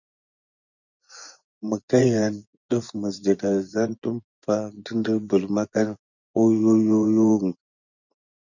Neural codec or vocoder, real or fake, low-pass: vocoder, 24 kHz, 100 mel bands, Vocos; fake; 7.2 kHz